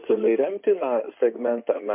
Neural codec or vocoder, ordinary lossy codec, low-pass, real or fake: codec, 16 kHz in and 24 kHz out, 2.2 kbps, FireRedTTS-2 codec; MP3, 24 kbps; 3.6 kHz; fake